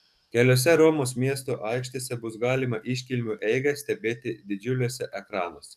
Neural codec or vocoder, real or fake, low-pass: codec, 44.1 kHz, 7.8 kbps, DAC; fake; 14.4 kHz